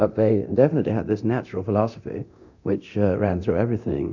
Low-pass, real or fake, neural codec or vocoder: 7.2 kHz; fake; codec, 24 kHz, 0.9 kbps, DualCodec